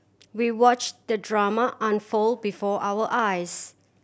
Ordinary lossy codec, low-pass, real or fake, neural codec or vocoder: none; none; real; none